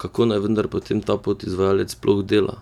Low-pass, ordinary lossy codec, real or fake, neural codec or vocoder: 19.8 kHz; none; real; none